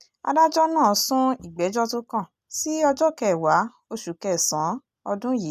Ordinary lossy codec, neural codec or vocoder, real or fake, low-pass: none; none; real; 14.4 kHz